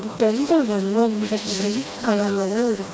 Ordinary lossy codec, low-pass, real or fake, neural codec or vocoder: none; none; fake; codec, 16 kHz, 1 kbps, FreqCodec, smaller model